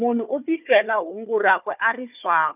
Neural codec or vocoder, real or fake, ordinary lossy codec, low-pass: codec, 16 kHz, 4 kbps, FunCodec, trained on Chinese and English, 50 frames a second; fake; none; 3.6 kHz